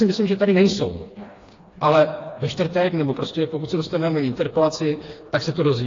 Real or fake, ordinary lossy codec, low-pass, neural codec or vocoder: fake; AAC, 32 kbps; 7.2 kHz; codec, 16 kHz, 2 kbps, FreqCodec, smaller model